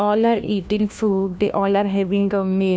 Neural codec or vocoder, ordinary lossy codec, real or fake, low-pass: codec, 16 kHz, 1 kbps, FunCodec, trained on LibriTTS, 50 frames a second; none; fake; none